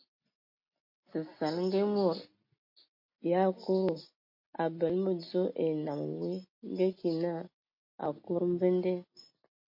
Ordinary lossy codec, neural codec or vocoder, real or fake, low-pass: MP3, 32 kbps; none; real; 5.4 kHz